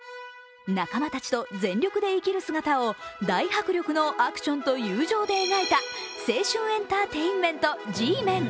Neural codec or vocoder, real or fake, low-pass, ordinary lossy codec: none; real; none; none